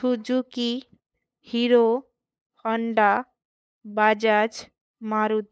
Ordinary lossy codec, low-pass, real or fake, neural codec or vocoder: none; none; fake; codec, 16 kHz, 8 kbps, FunCodec, trained on LibriTTS, 25 frames a second